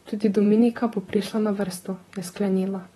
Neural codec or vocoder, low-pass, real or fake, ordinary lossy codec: vocoder, 44.1 kHz, 128 mel bands, Pupu-Vocoder; 19.8 kHz; fake; AAC, 32 kbps